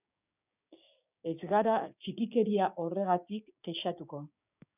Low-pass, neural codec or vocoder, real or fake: 3.6 kHz; codec, 16 kHz, 6 kbps, DAC; fake